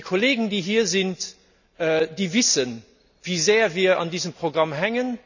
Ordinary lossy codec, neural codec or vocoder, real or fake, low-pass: none; none; real; 7.2 kHz